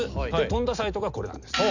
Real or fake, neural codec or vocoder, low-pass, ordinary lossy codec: real; none; 7.2 kHz; none